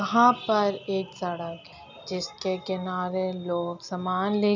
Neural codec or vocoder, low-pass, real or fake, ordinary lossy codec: none; 7.2 kHz; real; none